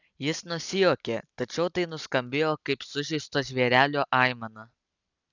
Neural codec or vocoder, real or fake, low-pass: none; real; 7.2 kHz